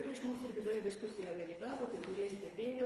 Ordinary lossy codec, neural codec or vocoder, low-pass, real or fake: Opus, 32 kbps; codec, 24 kHz, 3 kbps, HILCodec; 10.8 kHz; fake